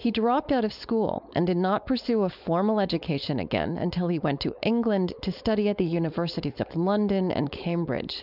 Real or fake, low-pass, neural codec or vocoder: fake; 5.4 kHz; codec, 16 kHz, 4.8 kbps, FACodec